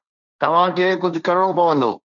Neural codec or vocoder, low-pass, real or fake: codec, 16 kHz, 1.1 kbps, Voila-Tokenizer; 7.2 kHz; fake